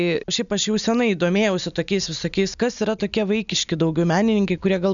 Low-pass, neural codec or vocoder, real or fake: 7.2 kHz; none; real